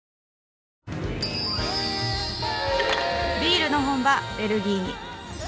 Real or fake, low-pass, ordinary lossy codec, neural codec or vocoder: real; none; none; none